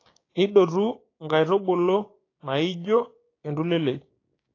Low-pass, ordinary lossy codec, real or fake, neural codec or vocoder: 7.2 kHz; AAC, 32 kbps; fake; codec, 16 kHz, 6 kbps, DAC